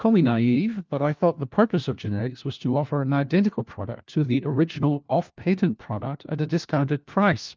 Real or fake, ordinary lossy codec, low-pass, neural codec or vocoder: fake; Opus, 32 kbps; 7.2 kHz; codec, 16 kHz, 1 kbps, FunCodec, trained on LibriTTS, 50 frames a second